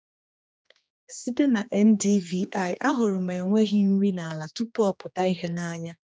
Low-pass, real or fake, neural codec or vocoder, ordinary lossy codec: none; fake; codec, 16 kHz, 2 kbps, X-Codec, HuBERT features, trained on general audio; none